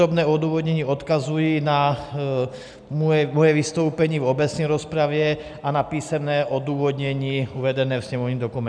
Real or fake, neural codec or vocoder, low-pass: real; none; 9.9 kHz